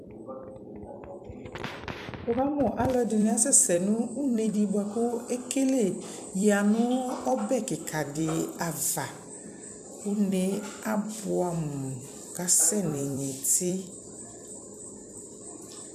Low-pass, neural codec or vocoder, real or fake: 14.4 kHz; vocoder, 44.1 kHz, 128 mel bands every 256 samples, BigVGAN v2; fake